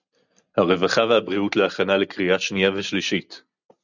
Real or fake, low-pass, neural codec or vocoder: real; 7.2 kHz; none